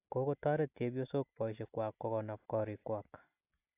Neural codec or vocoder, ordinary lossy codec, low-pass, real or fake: none; AAC, 32 kbps; 3.6 kHz; real